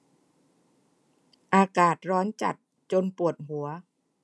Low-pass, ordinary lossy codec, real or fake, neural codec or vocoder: none; none; real; none